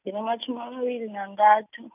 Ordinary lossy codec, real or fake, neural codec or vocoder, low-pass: none; real; none; 3.6 kHz